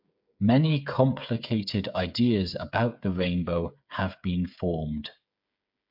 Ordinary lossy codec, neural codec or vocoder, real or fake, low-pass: MP3, 48 kbps; codec, 16 kHz, 8 kbps, FreqCodec, smaller model; fake; 5.4 kHz